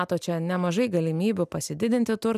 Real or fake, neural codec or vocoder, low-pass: real; none; 14.4 kHz